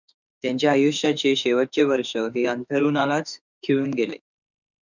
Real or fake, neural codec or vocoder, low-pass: fake; autoencoder, 48 kHz, 32 numbers a frame, DAC-VAE, trained on Japanese speech; 7.2 kHz